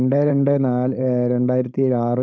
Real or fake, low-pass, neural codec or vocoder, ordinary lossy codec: fake; none; codec, 16 kHz, 4.8 kbps, FACodec; none